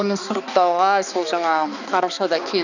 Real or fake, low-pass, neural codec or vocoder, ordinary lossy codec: fake; 7.2 kHz; codec, 16 kHz, 2 kbps, X-Codec, HuBERT features, trained on balanced general audio; none